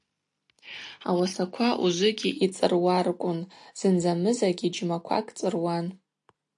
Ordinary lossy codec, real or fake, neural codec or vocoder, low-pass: AAC, 64 kbps; real; none; 10.8 kHz